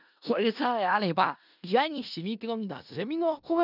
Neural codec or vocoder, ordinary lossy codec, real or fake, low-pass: codec, 16 kHz in and 24 kHz out, 0.4 kbps, LongCat-Audio-Codec, four codebook decoder; none; fake; 5.4 kHz